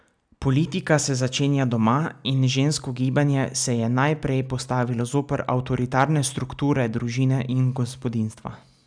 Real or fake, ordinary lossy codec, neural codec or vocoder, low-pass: real; none; none; 9.9 kHz